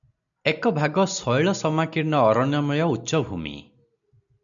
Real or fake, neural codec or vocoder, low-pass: real; none; 7.2 kHz